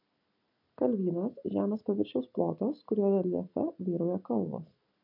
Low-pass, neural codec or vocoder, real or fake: 5.4 kHz; none; real